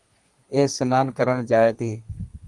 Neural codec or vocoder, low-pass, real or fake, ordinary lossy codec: codec, 32 kHz, 1.9 kbps, SNAC; 10.8 kHz; fake; Opus, 24 kbps